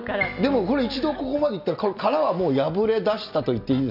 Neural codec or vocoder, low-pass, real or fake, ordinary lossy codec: none; 5.4 kHz; real; none